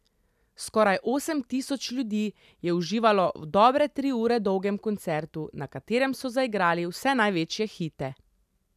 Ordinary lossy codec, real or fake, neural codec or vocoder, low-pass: none; real; none; 14.4 kHz